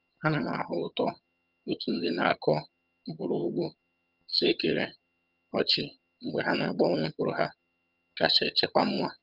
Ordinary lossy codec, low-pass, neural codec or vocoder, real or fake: Opus, 24 kbps; 5.4 kHz; vocoder, 22.05 kHz, 80 mel bands, HiFi-GAN; fake